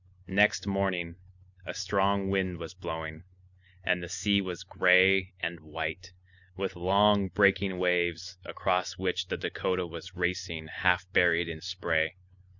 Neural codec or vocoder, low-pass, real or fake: none; 7.2 kHz; real